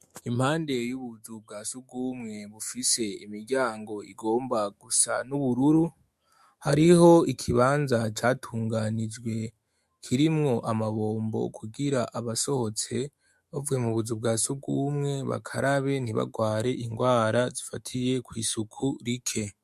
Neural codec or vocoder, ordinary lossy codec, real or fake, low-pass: autoencoder, 48 kHz, 128 numbers a frame, DAC-VAE, trained on Japanese speech; MP3, 64 kbps; fake; 14.4 kHz